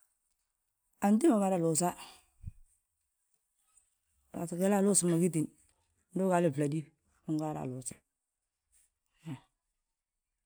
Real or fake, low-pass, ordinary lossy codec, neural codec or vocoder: real; none; none; none